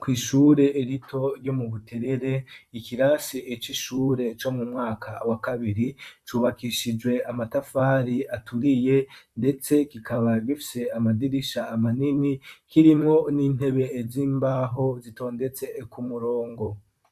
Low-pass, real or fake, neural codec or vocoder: 14.4 kHz; fake; vocoder, 44.1 kHz, 128 mel bands, Pupu-Vocoder